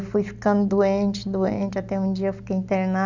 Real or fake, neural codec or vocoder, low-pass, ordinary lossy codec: real; none; 7.2 kHz; none